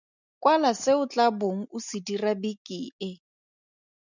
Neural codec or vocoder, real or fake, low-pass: none; real; 7.2 kHz